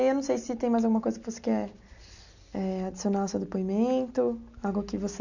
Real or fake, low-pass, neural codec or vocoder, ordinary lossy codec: real; 7.2 kHz; none; none